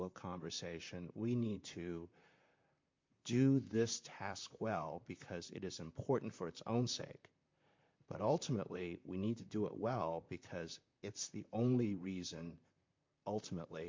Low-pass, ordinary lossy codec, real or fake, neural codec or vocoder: 7.2 kHz; MP3, 48 kbps; fake; vocoder, 44.1 kHz, 128 mel bands, Pupu-Vocoder